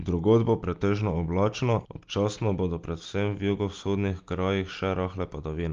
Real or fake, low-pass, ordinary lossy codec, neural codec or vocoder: real; 7.2 kHz; Opus, 32 kbps; none